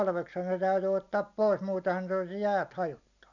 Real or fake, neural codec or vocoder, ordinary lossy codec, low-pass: real; none; none; 7.2 kHz